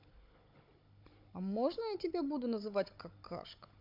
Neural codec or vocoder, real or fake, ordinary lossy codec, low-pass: codec, 16 kHz, 16 kbps, FunCodec, trained on Chinese and English, 50 frames a second; fake; MP3, 48 kbps; 5.4 kHz